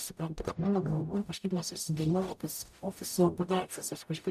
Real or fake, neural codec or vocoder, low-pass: fake; codec, 44.1 kHz, 0.9 kbps, DAC; 14.4 kHz